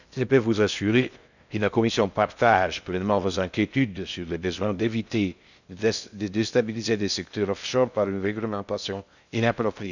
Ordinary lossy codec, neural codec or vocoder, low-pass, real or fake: none; codec, 16 kHz in and 24 kHz out, 0.6 kbps, FocalCodec, streaming, 2048 codes; 7.2 kHz; fake